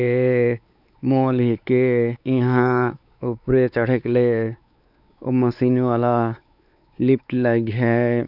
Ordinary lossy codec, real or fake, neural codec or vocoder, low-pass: none; fake; codec, 16 kHz, 4 kbps, X-Codec, WavLM features, trained on Multilingual LibriSpeech; 5.4 kHz